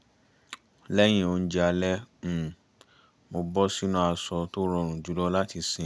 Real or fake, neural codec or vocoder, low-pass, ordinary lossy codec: real; none; none; none